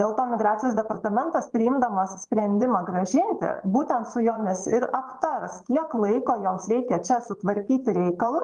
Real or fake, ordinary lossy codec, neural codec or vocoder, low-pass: fake; Opus, 24 kbps; vocoder, 22.05 kHz, 80 mel bands, Vocos; 9.9 kHz